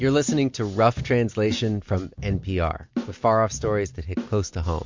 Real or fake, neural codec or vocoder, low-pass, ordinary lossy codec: real; none; 7.2 kHz; MP3, 48 kbps